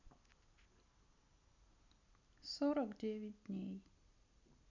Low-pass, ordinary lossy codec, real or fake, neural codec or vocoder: 7.2 kHz; none; real; none